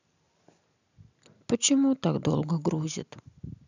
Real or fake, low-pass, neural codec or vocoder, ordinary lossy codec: real; 7.2 kHz; none; none